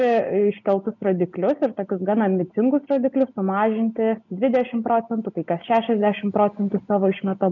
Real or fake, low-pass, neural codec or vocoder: real; 7.2 kHz; none